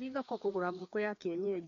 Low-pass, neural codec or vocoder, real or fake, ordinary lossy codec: 7.2 kHz; codec, 16 kHz, 2 kbps, FreqCodec, larger model; fake; MP3, 64 kbps